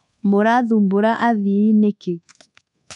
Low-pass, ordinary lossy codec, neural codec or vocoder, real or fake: 10.8 kHz; none; codec, 24 kHz, 1.2 kbps, DualCodec; fake